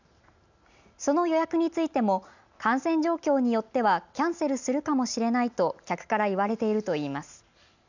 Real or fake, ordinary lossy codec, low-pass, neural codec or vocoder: real; none; 7.2 kHz; none